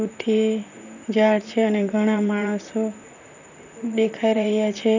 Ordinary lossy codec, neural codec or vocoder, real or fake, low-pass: none; vocoder, 44.1 kHz, 128 mel bands, Pupu-Vocoder; fake; 7.2 kHz